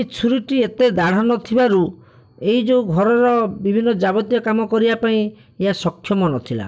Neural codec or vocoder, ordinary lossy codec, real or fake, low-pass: none; none; real; none